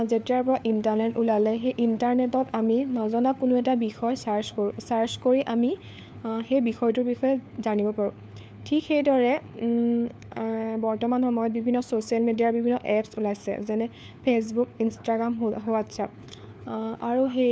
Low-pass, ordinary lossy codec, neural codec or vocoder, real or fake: none; none; codec, 16 kHz, 4 kbps, FunCodec, trained on LibriTTS, 50 frames a second; fake